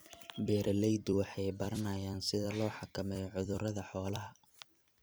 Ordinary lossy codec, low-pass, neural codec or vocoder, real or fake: none; none; vocoder, 44.1 kHz, 128 mel bands every 256 samples, BigVGAN v2; fake